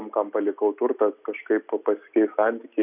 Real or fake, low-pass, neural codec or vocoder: real; 3.6 kHz; none